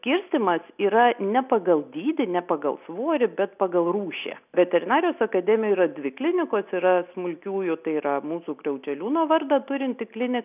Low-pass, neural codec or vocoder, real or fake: 3.6 kHz; none; real